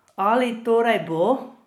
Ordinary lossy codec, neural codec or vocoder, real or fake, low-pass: MP3, 96 kbps; vocoder, 44.1 kHz, 128 mel bands every 256 samples, BigVGAN v2; fake; 19.8 kHz